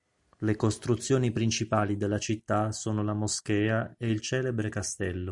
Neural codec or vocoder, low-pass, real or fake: none; 10.8 kHz; real